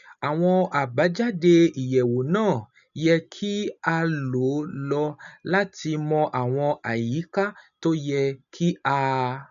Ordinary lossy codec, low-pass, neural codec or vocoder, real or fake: none; 7.2 kHz; none; real